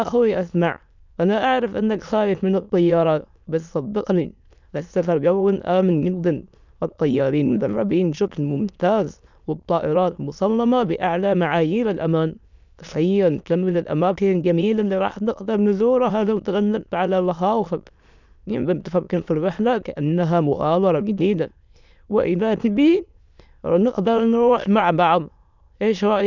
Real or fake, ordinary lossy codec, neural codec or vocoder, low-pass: fake; none; autoencoder, 22.05 kHz, a latent of 192 numbers a frame, VITS, trained on many speakers; 7.2 kHz